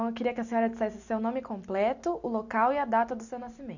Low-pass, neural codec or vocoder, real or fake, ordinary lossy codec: 7.2 kHz; none; real; none